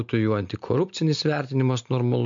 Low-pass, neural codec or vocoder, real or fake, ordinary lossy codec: 7.2 kHz; none; real; MP3, 48 kbps